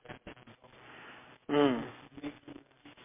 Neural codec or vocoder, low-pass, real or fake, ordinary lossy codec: none; 3.6 kHz; real; MP3, 32 kbps